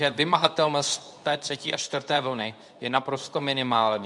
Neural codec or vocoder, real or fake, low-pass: codec, 24 kHz, 0.9 kbps, WavTokenizer, medium speech release version 2; fake; 10.8 kHz